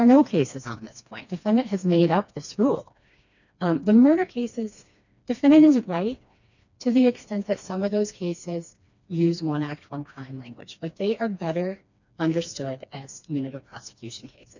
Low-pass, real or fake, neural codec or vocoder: 7.2 kHz; fake; codec, 16 kHz, 2 kbps, FreqCodec, smaller model